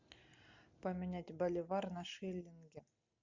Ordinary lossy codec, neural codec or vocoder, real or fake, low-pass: MP3, 64 kbps; none; real; 7.2 kHz